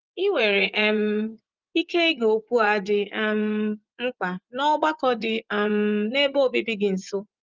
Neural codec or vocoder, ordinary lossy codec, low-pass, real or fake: vocoder, 24 kHz, 100 mel bands, Vocos; Opus, 24 kbps; 7.2 kHz; fake